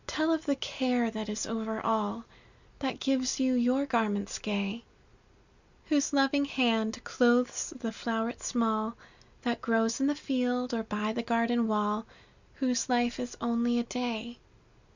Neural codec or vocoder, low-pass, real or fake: none; 7.2 kHz; real